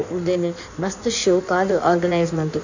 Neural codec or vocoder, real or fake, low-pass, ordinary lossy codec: codec, 16 kHz in and 24 kHz out, 1.1 kbps, FireRedTTS-2 codec; fake; 7.2 kHz; none